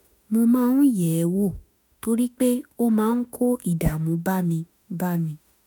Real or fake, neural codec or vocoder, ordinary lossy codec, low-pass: fake; autoencoder, 48 kHz, 32 numbers a frame, DAC-VAE, trained on Japanese speech; none; none